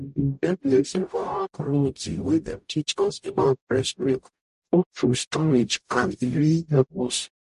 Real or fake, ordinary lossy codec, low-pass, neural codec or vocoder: fake; MP3, 48 kbps; 14.4 kHz; codec, 44.1 kHz, 0.9 kbps, DAC